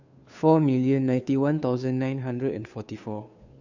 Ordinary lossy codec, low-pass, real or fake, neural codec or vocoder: none; 7.2 kHz; fake; codec, 16 kHz, 2 kbps, FunCodec, trained on Chinese and English, 25 frames a second